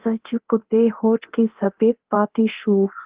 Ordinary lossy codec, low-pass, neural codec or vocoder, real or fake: Opus, 16 kbps; 3.6 kHz; codec, 24 kHz, 0.9 kbps, DualCodec; fake